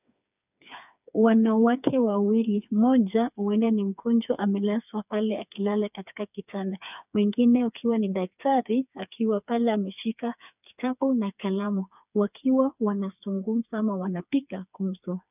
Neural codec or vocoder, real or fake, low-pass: codec, 16 kHz, 4 kbps, FreqCodec, smaller model; fake; 3.6 kHz